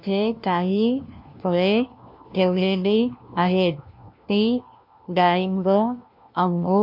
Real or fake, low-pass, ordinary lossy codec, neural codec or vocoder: fake; 5.4 kHz; MP3, 48 kbps; codec, 16 kHz, 1 kbps, FunCodec, trained on LibriTTS, 50 frames a second